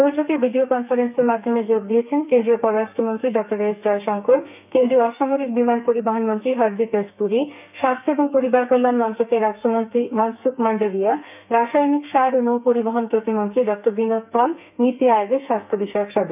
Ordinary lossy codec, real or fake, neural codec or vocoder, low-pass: none; fake; codec, 32 kHz, 1.9 kbps, SNAC; 3.6 kHz